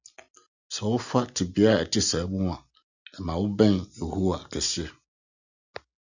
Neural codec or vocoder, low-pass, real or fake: none; 7.2 kHz; real